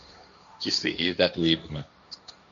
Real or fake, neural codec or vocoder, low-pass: fake; codec, 16 kHz, 1.1 kbps, Voila-Tokenizer; 7.2 kHz